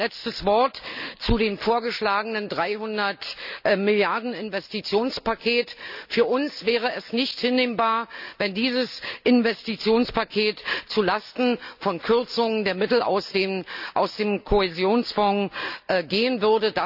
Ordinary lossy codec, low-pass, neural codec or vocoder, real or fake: none; 5.4 kHz; none; real